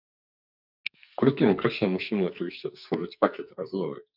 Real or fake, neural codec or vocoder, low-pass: fake; codec, 44.1 kHz, 2.6 kbps, SNAC; 5.4 kHz